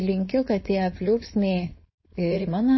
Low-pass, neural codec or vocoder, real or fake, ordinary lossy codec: 7.2 kHz; codec, 16 kHz, 4.8 kbps, FACodec; fake; MP3, 24 kbps